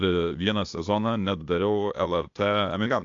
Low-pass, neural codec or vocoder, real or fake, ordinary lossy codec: 7.2 kHz; codec, 16 kHz, 0.8 kbps, ZipCodec; fake; AAC, 64 kbps